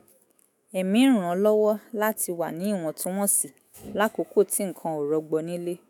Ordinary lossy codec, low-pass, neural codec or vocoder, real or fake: none; none; autoencoder, 48 kHz, 128 numbers a frame, DAC-VAE, trained on Japanese speech; fake